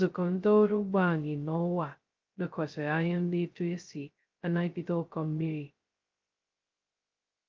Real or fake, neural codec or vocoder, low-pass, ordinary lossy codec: fake; codec, 16 kHz, 0.2 kbps, FocalCodec; 7.2 kHz; Opus, 32 kbps